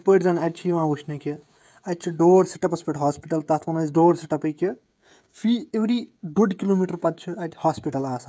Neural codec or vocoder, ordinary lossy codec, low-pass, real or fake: codec, 16 kHz, 16 kbps, FreqCodec, smaller model; none; none; fake